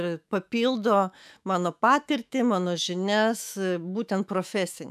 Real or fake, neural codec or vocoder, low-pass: fake; autoencoder, 48 kHz, 128 numbers a frame, DAC-VAE, trained on Japanese speech; 14.4 kHz